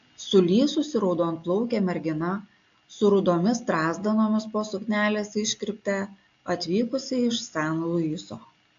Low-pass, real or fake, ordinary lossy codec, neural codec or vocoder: 7.2 kHz; real; AAC, 48 kbps; none